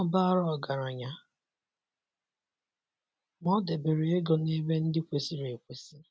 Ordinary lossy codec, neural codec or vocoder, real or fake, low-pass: none; none; real; none